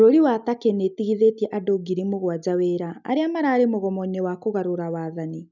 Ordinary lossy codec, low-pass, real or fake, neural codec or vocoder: none; 7.2 kHz; real; none